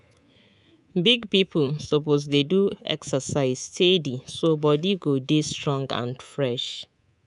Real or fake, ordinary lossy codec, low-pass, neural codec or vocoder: fake; none; 10.8 kHz; codec, 24 kHz, 3.1 kbps, DualCodec